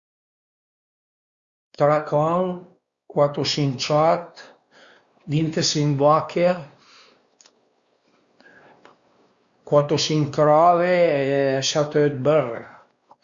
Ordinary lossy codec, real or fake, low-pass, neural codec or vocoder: Opus, 64 kbps; fake; 7.2 kHz; codec, 16 kHz, 2 kbps, X-Codec, WavLM features, trained on Multilingual LibriSpeech